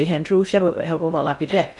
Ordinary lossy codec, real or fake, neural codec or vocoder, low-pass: none; fake; codec, 16 kHz in and 24 kHz out, 0.6 kbps, FocalCodec, streaming, 2048 codes; 10.8 kHz